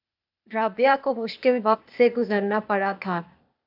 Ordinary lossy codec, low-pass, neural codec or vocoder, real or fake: AAC, 48 kbps; 5.4 kHz; codec, 16 kHz, 0.8 kbps, ZipCodec; fake